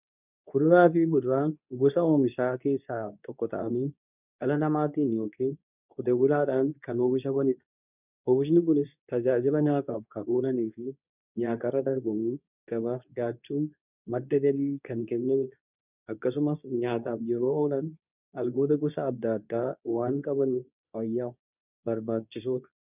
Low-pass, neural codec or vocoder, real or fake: 3.6 kHz; codec, 24 kHz, 0.9 kbps, WavTokenizer, medium speech release version 2; fake